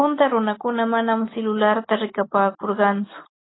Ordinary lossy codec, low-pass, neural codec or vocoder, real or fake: AAC, 16 kbps; 7.2 kHz; none; real